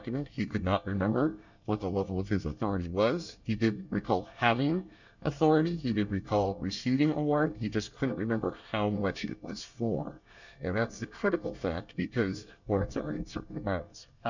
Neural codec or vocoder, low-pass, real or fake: codec, 24 kHz, 1 kbps, SNAC; 7.2 kHz; fake